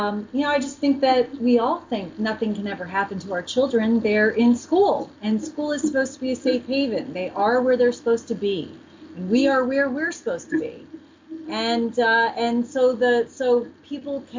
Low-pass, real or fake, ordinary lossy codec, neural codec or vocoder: 7.2 kHz; real; MP3, 48 kbps; none